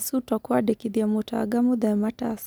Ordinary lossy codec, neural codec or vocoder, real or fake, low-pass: none; none; real; none